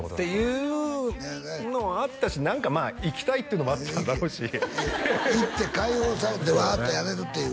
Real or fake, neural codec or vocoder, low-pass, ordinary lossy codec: real; none; none; none